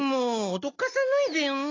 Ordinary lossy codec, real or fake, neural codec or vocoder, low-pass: AAC, 32 kbps; real; none; 7.2 kHz